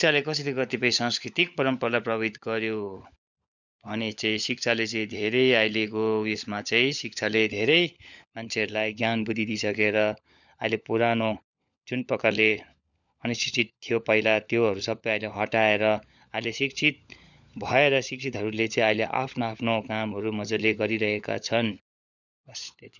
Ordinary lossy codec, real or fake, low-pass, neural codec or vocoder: none; fake; 7.2 kHz; codec, 16 kHz, 16 kbps, FunCodec, trained on LibriTTS, 50 frames a second